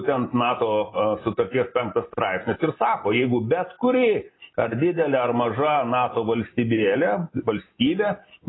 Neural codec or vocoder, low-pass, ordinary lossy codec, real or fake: none; 7.2 kHz; AAC, 16 kbps; real